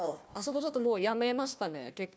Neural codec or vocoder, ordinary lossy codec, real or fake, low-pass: codec, 16 kHz, 1 kbps, FunCodec, trained on Chinese and English, 50 frames a second; none; fake; none